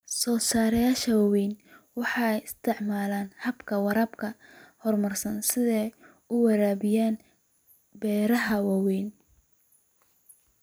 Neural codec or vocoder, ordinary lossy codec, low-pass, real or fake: vocoder, 44.1 kHz, 128 mel bands every 256 samples, BigVGAN v2; none; none; fake